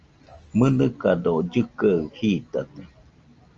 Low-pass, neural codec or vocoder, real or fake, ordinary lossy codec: 7.2 kHz; none; real; Opus, 24 kbps